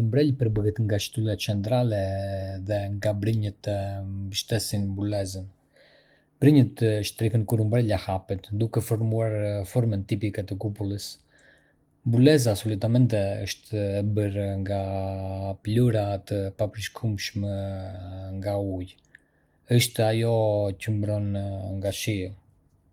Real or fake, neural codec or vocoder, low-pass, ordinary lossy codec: real; none; 19.8 kHz; Opus, 24 kbps